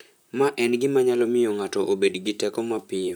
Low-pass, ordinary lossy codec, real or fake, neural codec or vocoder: none; none; fake; vocoder, 44.1 kHz, 128 mel bands, Pupu-Vocoder